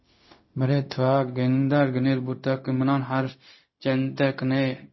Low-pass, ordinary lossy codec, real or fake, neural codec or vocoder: 7.2 kHz; MP3, 24 kbps; fake; codec, 16 kHz, 0.4 kbps, LongCat-Audio-Codec